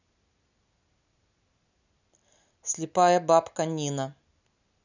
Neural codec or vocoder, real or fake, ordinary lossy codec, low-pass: none; real; none; 7.2 kHz